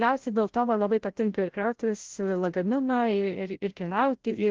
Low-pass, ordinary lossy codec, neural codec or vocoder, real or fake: 7.2 kHz; Opus, 16 kbps; codec, 16 kHz, 0.5 kbps, FreqCodec, larger model; fake